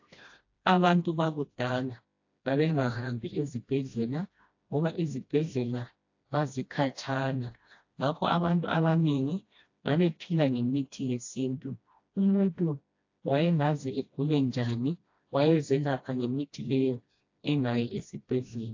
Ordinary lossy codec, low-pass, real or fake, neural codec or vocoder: AAC, 48 kbps; 7.2 kHz; fake; codec, 16 kHz, 1 kbps, FreqCodec, smaller model